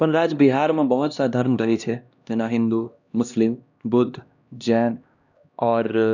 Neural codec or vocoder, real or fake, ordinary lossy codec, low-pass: codec, 16 kHz, 1 kbps, X-Codec, HuBERT features, trained on LibriSpeech; fake; none; 7.2 kHz